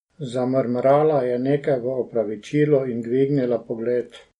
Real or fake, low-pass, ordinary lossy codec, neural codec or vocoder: real; 19.8 kHz; MP3, 48 kbps; none